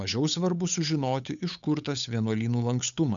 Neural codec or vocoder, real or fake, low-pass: codec, 16 kHz, 8 kbps, FunCodec, trained on Chinese and English, 25 frames a second; fake; 7.2 kHz